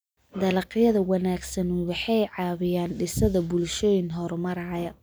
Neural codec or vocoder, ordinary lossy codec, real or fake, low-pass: none; none; real; none